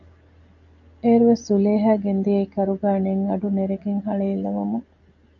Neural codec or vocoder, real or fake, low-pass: none; real; 7.2 kHz